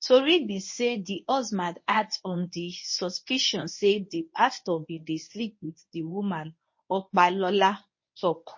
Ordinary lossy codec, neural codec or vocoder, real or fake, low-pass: MP3, 32 kbps; codec, 24 kHz, 0.9 kbps, WavTokenizer, medium speech release version 1; fake; 7.2 kHz